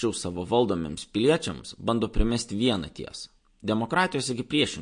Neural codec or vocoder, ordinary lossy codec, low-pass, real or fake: none; MP3, 48 kbps; 9.9 kHz; real